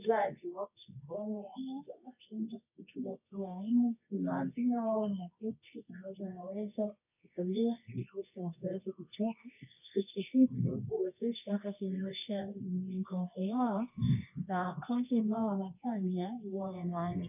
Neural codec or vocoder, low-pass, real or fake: codec, 24 kHz, 0.9 kbps, WavTokenizer, medium music audio release; 3.6 kHz; fake